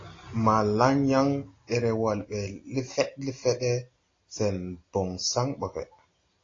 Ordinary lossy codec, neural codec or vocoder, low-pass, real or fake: AAC, 32 kbps; none; 7.2 kHz; real